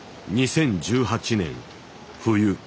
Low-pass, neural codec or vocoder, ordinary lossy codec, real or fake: none; none; none; real